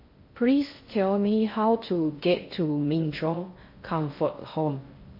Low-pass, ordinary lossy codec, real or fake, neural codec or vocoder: 5.4 kHz; MP3, 32 kbps; fake; codec, 16 kHz in and 24 kHz out, 0.6 kbps, FocalCodec, streaming, 2048 codes